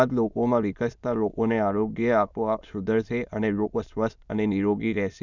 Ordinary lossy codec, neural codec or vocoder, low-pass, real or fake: none; autoencoder, 22.05 kHz, a latent of 192 numbers a frame, VITS, trained on many speakers; 7.2 kHz; fake